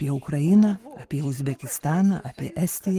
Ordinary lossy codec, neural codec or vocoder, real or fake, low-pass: Opus, 24 kbps; codec, 44.1 kHz, 7.8 kbps, Pupu-Codec; fake; 14.4 kHz